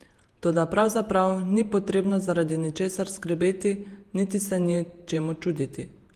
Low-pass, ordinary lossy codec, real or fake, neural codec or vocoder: 14.4 kHz; Opus, 24 kbps; fake; vocoder, 48 kHz, 128 mel bands, Vocos